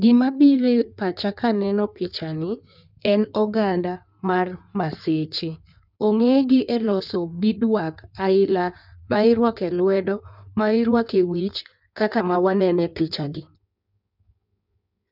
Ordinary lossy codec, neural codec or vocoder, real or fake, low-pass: none; codec, 16 kHz in and 24 kHz out, 1.1 kbps, FireRedTTS-2 codec; fake; 5.4 kHz